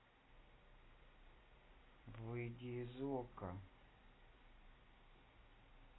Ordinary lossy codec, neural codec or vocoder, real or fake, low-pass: AAC, 16 kbps; none; real; 7.2 kHz